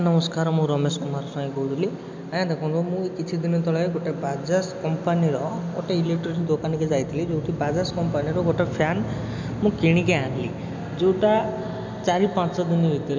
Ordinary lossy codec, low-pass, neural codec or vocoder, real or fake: MP3, 64 kbps; 7.2 kHz; none; real